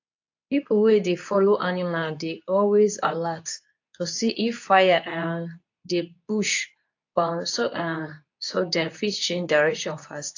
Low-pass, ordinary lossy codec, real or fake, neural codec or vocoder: 7.2 kHz; AAC, 48 kbps; fake; codec, 24 kHz, 0.9 kbps, WavTokenizer, medium speech release version 2